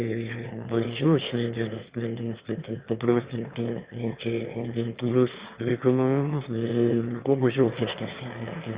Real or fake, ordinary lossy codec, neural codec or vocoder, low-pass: fake; Opus, 64 kbps; autoencoder, 22.05 kHz, a latent of 192 numbers a frame, VITS, trained on one speaker; 3.6 kHz